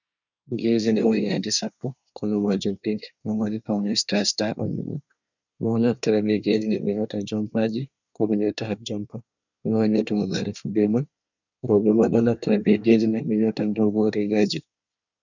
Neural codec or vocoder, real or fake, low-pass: codec, 24 kHz, 1 kbps, SNAC; fake; 7.2 kHz